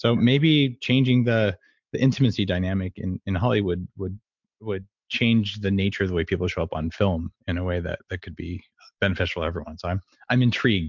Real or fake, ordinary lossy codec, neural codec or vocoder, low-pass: real; MP3, 64 kbps; none; 7.2 kHz